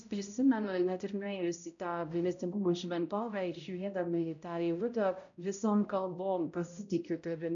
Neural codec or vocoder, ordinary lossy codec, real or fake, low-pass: codec, 16 kHz, 0.5 kbps, X-Codec, HuBERT features, trained on balanced general audio; AAC, 64 kbps; fake; 7.2 kHz